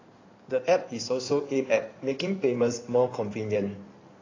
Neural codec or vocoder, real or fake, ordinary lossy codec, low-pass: codec, 16 kHz in and 24 kHz out, 2.2 kbps, FireRedTTS-2 codec; fake; AAC, 32 kbps; 7.2 kHz